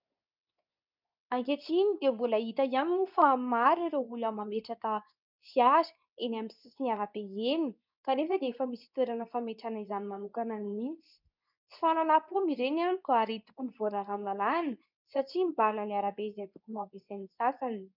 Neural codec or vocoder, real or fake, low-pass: codec, 24 kHz, 0.9 kbps, WavTokenizer, medium speech release version 2; fake; 5.4 kHz